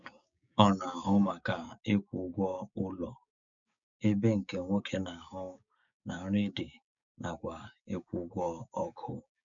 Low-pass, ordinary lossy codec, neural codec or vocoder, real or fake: 7.2 kHz; none; codec, 16 kHz, 6 kbps, DAC; fake